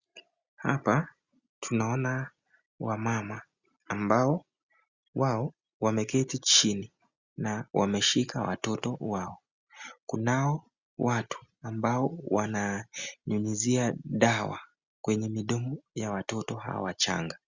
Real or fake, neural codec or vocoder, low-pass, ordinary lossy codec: real; none; 7.2 kHz; Opus, 64 kbps